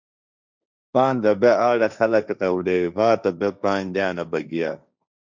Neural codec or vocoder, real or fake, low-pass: codec, 16 kHz, 1.1 kbps, Voila-Tokenizer; fake; 7.2 kHz